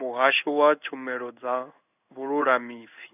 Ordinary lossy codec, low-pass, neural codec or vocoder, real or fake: none; 3.6 kHz; codec, 16 kHz in and 24 kHz out, 1 kbps, XY-Tokenizer; fake